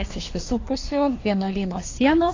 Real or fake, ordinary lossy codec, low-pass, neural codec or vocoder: fake; AAC, 32 kbps; 7.2 kHz; codec, 24 kHz, 1 kbps, SNAC